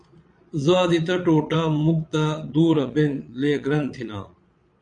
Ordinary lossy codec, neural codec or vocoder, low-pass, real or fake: MP3, 96 kbps; vocoder, 22.05 kHz, 80 mel bands, Vocos; 9.9 kHz; fake